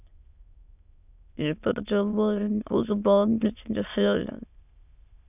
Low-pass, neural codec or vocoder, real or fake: 3.6 kHz; autoencoder, 22.05 kHz, a latent of 192 numbers a frame, VITS, trained on many speakers; fake